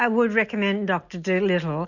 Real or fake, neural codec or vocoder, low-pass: real; none; 7.2 kHz